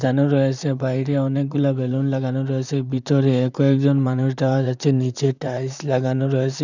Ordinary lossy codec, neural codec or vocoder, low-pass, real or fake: none; vocoder, 44.1 kHz, 128 mel bands, Pupu-Vocoder; 7.2 kHz; fake